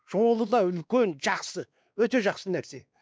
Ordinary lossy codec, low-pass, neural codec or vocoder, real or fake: none; none; codec, 16 kHz, 2 kbps, X-Codec, WavLM features, trained on Multilingual LibriSpeech; fake